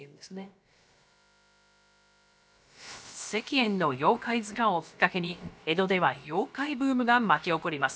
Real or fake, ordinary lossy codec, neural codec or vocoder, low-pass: fake; none; codec, 16 kHz, about 1 kbps, DyCAST, with the encoder's durations; none